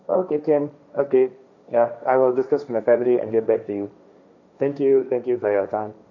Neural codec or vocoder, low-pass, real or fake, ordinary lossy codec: codec, 16 kHz, 1.1 kbps, Voila-Tokenizer; 7.2 kHz; fake; none